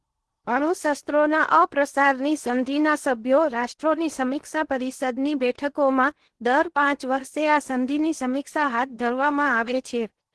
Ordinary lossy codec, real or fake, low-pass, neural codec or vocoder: Opus, 16 kbps; fake; 10.8 kHz; codec, 16 kHz in and 24 kHz out, 0.8 kbps, FocalCodec, streaming, 65536 codes